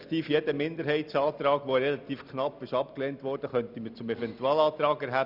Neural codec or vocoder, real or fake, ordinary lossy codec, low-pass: none; real; none; 5.4 kHz